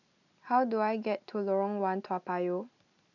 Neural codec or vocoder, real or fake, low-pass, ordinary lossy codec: none; real; 7.2 kHz; none